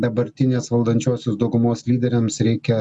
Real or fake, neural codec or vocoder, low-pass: real; none; 10.8 kHz